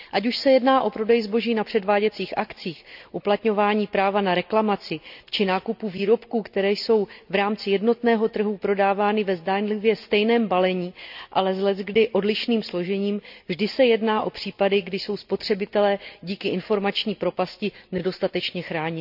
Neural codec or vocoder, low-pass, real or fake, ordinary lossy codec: none; 5.4 kHz; real; none